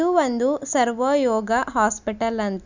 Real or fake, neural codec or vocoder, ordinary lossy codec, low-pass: real; none; none; 7.2 kHz